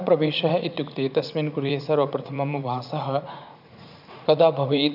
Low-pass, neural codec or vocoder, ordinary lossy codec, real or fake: 5.4 kHz; vocoder, 44.1 kHz, 80 mel bands, Vocos; none; fake